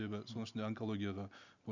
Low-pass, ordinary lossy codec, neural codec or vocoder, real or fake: 7.2 kHz; none; none; real